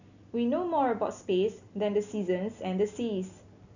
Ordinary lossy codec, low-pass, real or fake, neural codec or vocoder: none; 7.2 kHz; real; none